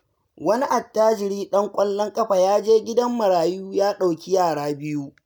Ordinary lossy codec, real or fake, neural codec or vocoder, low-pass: none; real; none; none